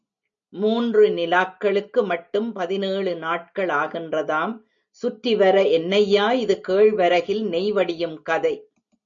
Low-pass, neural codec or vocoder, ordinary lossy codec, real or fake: 7.2 kHz; none; MP3, 96 kbps; real